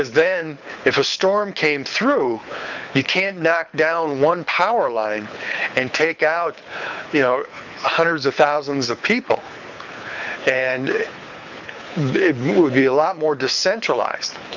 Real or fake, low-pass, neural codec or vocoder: fake; 7.2 kHz; codec, 24 kHz, 6 kbps, HILCodec